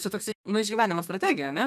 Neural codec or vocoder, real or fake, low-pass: codec, 32 kHz, 1.9 kbps, SNAC; fake; 14.4 kHz